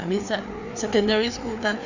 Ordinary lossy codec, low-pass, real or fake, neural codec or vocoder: none; 7.2 kHz; fake; codec, 16 kHz, 4 kbps, FreqCodec, larger model